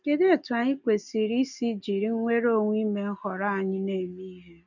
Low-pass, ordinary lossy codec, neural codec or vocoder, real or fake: 7.2 kHz; none; none; real